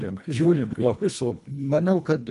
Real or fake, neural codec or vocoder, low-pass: fake; codec, 24 kHz, 1.5 kbps, HILCodec; 10.8 kHz